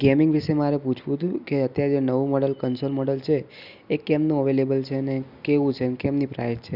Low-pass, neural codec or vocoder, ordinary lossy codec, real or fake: 5.4 kHz; none; none; real